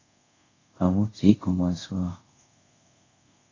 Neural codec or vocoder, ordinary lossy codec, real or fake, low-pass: codec, 24 kHz, 0.5 kbps, DualCodec; AAC, 32 kbps; fake; 7.2 kHz